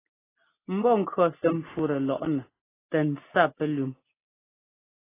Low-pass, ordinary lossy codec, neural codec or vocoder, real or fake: 3.6 kHz; AAC, 16 kbps; none; real